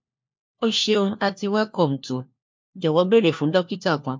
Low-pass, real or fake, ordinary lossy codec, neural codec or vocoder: 7.2 kHz; fake; MP3, 64 kbps; codec, 16 kHz, 1 kbps, FunCodec, trained on LibriTTS, 50 frames a second